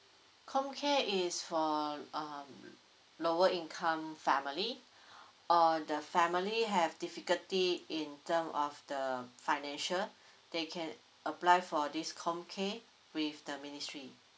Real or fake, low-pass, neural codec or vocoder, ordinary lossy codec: real; none; none; none